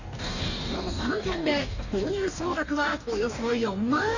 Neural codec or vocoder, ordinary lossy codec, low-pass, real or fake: codec, 44.1 kHz, 2.6 kbps, DAC; none; 7.2 kHz; fake